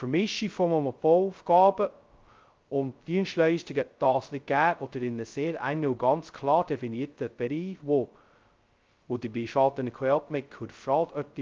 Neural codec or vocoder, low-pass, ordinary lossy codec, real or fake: codec, 16 kHz, 0.2 kbps, FocalCodec; 7.2 kHz; Opus, 24 kbps; fake